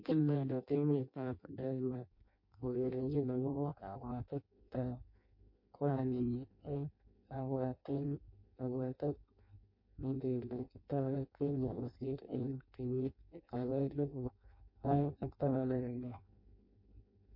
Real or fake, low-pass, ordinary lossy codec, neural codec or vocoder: fake; 5.4 kHz; MP3, 32 kbps; codec, 16 kHz in and 24 kHz out, 0.6 kbps, FireRedTTS-2 codec